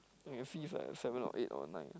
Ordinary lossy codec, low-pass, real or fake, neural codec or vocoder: none; none; real; none